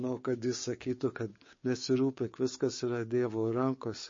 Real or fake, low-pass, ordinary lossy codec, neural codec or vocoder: fake; 7.2 kHz; MP3, 32 kbps; codec, 16 kHz, 6 kbps, DAC